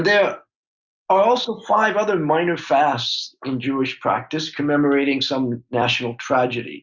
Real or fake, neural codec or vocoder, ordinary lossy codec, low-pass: real; none; Opus, 64 kbps; 7.2 kHz